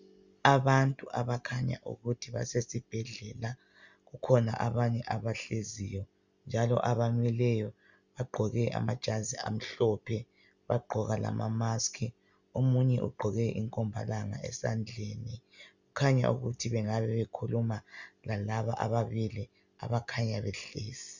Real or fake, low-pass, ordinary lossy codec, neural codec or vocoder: real; 7.2 kHz; Opus, 64 kbps; none